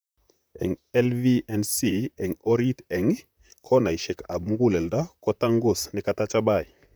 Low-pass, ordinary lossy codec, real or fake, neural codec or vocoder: none; none; fake; vocoder, 44.1 kHz, 128 mel bands, Pupu-Vocoder